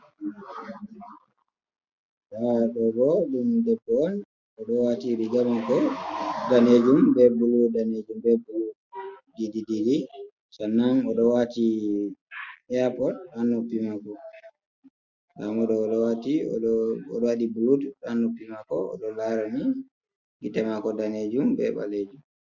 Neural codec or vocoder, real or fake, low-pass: none; real; 7.2 kHz